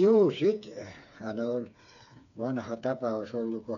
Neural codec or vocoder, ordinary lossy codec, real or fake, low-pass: codec, 16 kHz, 4 kbps, FreqCodec, smaller model; none; fake; 7.2 kHz